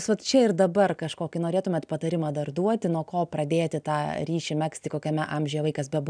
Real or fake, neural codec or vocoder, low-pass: real; none; 9.9 kHz